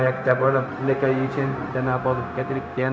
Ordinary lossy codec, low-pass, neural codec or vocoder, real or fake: none; none; codec, 16 kHz, 0.4 kbps, LongCat-Audio-Codec; fake